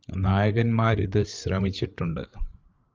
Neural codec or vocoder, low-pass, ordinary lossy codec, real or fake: codec, 16 kHz, 8 kbps, FreqCodec, larger model; 7.2 kHz; Opus, 32 kbps; fake